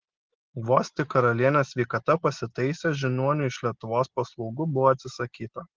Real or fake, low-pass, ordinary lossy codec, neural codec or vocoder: real; 7.2 kHz; Opus, 16 kbps; none